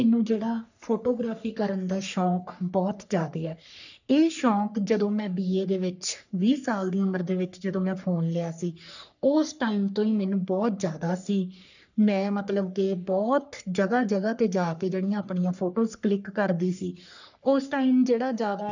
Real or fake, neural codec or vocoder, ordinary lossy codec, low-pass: fake; codec, 44.1 kHz, 3.4 kbps, Pupu-Codec; none; 7.2 kHz